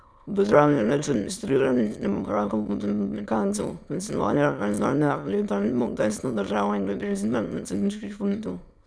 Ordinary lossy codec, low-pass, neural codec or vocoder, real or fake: none; none; autoencoder, 22.05 kHz, a latent of 192 numbers a frame, VITS, trained on many speakers; fake